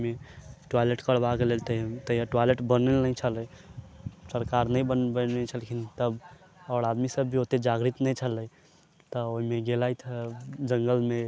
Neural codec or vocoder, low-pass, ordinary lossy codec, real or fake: none; none; none; real